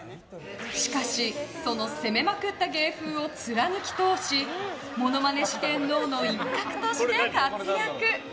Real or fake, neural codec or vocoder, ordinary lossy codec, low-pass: real; none; none; none